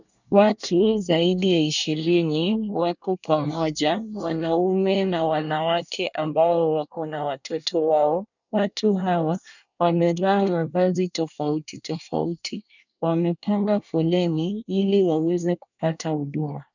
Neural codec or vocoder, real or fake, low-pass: codec, 24 kHz, 1 kbps, SNAC; fake; 7.2 kHz